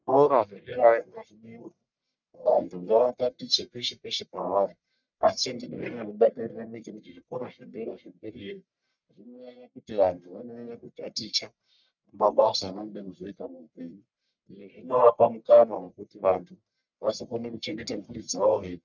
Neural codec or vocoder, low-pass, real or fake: codec, 44.1 kHz, 1.7 kbps, Pupu-Codec; 7.2 kHz; fake